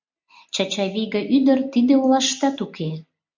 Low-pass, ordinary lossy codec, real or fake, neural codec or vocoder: 7.2 kHz; MP3, 64 kbps; real; none